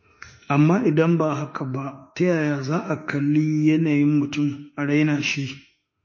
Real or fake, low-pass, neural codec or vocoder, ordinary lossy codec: fake; 7.2 kHz; autoencoder, 48 kHz, 32 numbers a frame, DAC-VAE, trained on Japanese speech; MP3, 32 kbps